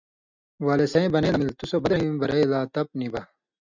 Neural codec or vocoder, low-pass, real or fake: none; 7.2 kHz; real